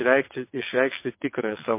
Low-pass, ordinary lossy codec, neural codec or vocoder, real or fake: 3.6 kHz; MP3, 24 kbps; vocoder, 22.05 kHz, 80 mel bands, WaveNeXt; fake